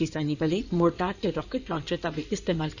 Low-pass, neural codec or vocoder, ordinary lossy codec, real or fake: 7.2 kHz; codec, 16 kHz in and 24 kHz out, 2.2 kbps, FireRedTTS-2 codec; none; fake